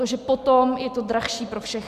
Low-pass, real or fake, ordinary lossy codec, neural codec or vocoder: 14.4 kHz; real; Opus, 64 kbps; none